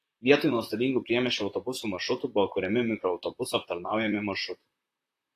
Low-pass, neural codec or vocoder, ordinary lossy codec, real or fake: 14.4 kHz; vocoder, 44.1 kHz, 128 mel bands, Pupu-Vocoder; AAC, 48 kbps; fake